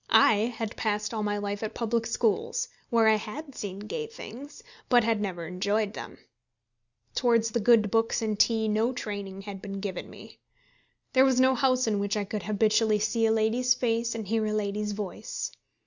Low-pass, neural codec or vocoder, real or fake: 7.2 kHz; none; real